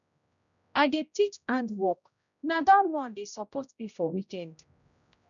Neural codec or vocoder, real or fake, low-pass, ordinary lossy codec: codec, 16 kHz, 0.5 kbps, X-Codec, HuBERT features, trained on general audio; fake; 7.2 kHz; none